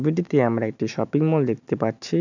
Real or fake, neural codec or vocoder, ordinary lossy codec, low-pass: real; none; none; 7.2 kHz